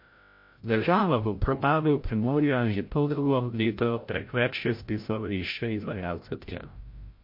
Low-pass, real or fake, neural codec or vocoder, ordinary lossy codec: 5.4 kHz; fake; codec, 16 kHz, 0.5 kbps, FreqCodec, larger model; MP3, 32 kbps